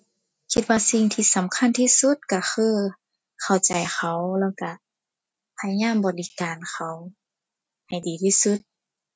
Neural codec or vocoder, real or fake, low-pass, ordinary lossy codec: none; real; none; none